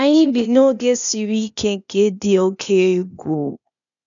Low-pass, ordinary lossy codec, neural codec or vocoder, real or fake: 7.2 kHz; none; codec, 16 kHz, 0.8 kbps, ZipCodec; fake